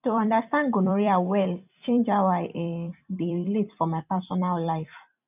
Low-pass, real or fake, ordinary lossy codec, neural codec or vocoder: 3.6 kHz; fake; none; vocoder, 44.1 kHz, 128 mel bands every 256 samples, BigVGAN v2